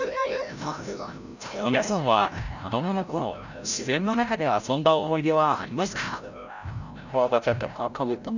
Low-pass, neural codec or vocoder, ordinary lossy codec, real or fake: 7.2 kHz; codec, 16 kHz, 0.5 kbps, FreqCodec, larger model; none; fake